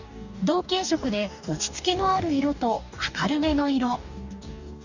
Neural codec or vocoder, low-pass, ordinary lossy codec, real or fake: codec, 44.1 kHz, 2.6 kbps, DAC; 7.2 kHz; none; fake